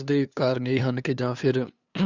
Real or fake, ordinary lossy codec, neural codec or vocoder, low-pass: fake; Opus, 64 kbps; codec, 16 kHz, 2 kbps, FunCodec, trained on LibriTTS, 25 frames a second; 7.2 kHz